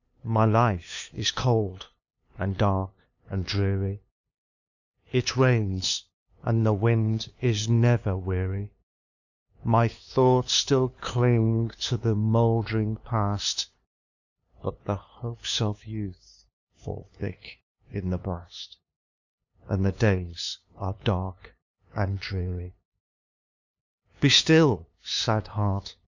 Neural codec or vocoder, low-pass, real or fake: codec, 16 kHz, 2 kbps, FunCodec, trained on LibriTTS, 25 frames a second; 7.2 kHz; fake